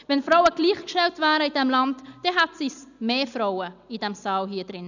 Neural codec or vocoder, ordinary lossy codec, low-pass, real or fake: none; none; 7.2 kHz; real